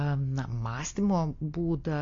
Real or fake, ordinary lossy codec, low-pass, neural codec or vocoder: real; AAC, 48 kbps; 7.2 kHz; none